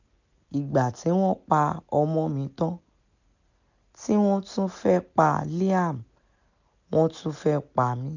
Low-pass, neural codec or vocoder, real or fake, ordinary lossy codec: 7.2 kHz; none; real; none